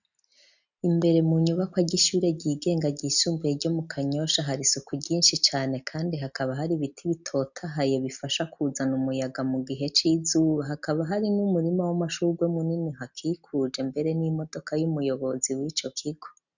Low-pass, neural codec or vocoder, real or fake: 7.2 kHz; none; real